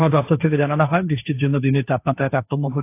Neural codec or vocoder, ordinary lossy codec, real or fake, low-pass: codec, 16 kHz, 1.1 kbps, Voila-Tokenizer; AAC, 24 kbps; fake; 3.6 kHz